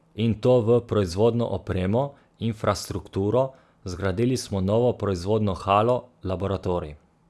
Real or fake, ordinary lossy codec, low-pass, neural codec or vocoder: real; none; none; none